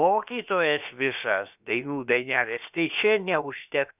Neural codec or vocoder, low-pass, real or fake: codec, 16 kHz, about 1 kbps, DyCAST, with the encoder's durations; 3.6 kHz; fake